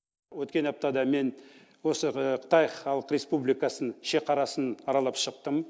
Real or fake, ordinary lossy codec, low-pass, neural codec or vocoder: real; none; none; none